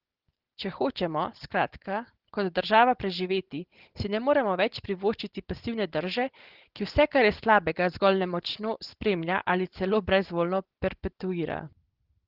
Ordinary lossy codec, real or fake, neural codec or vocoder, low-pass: Opus, 16 kbps; real; none; 5.4 kHz